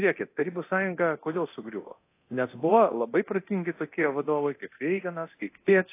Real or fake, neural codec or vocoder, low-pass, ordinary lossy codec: fake; codec, 24 kHz, 0.9 kbps, DualCodec; 3.6 kHz; AAC, 24 kbps